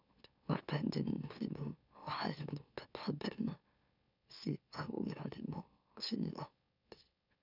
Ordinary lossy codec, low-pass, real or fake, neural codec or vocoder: none; 5.4 kHz; fake; autoencoder, 44.1 kHz, a latent of 192 numbers a frame, MeloTTS